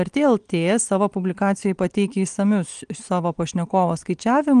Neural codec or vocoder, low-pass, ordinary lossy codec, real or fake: none; 9.9 kHz; Opus, 24 kbps; real